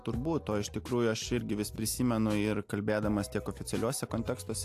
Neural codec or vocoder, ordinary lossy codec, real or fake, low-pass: none; AAC, 64 kbps; real; 14.4 kHz